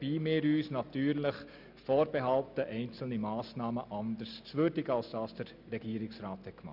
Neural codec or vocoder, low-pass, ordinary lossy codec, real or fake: none; 5.4 kHz; none; real